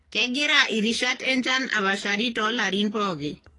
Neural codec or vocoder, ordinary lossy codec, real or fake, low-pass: codec, 44.1 kHz, 2.6 kbps, SNAC; AAC, 32 kbps; fake; 10.8 kHz